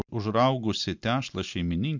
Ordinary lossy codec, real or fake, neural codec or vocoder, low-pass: MP3, 64 kbps; real; none; 7.2 kHz